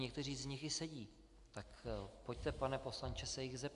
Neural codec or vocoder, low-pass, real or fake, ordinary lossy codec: none; 10.8 kHz; real; AAC, 96 kbps